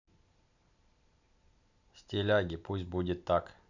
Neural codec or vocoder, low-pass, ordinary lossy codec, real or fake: none; 7.2 kHz; none; real